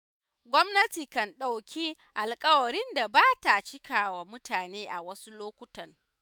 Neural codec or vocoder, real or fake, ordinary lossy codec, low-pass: autoencoder, 48 kHz, 128 numbers a frame, DAC-VAE, trained on Japanese speech; fake; none; none